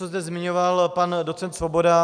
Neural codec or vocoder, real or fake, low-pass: none; real; 9.9 kHz